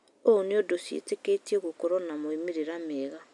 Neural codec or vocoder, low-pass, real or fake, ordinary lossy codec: none; 10.8 kHz; real; none